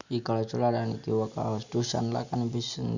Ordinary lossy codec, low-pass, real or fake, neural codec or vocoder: none; 7.2 kHz; real; none